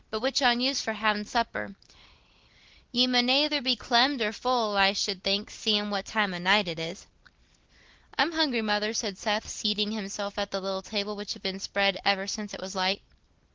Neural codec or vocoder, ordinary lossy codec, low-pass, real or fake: none; Opus, 16 kbps; 7.2 kHz; real